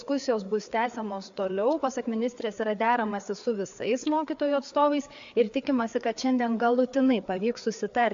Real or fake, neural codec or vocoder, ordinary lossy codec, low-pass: fake; codec, 16 kHz, 4 kbps, FreqCodec, larger model; AAC, 64 kbps; 7.2 kHz